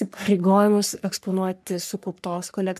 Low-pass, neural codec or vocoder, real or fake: 14.4 kHz; codec, 44.1 kHz, 3.4 kbps, Pupu-Codec; fake